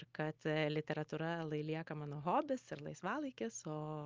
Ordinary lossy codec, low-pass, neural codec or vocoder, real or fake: Opus, 24 kbps; 7.2 kHz; none; real